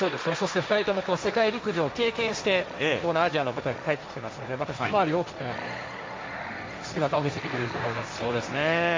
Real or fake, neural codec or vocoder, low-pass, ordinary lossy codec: fake; codec, 16 kHz, 1.1 kbps, Voila-Tokenizer; none; none